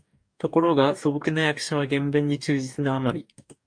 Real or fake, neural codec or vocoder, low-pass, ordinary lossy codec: fake; codec, 44.1 kHz, 2.6 kbps, DAC; 9.9 kHz; AAC, 64 kbps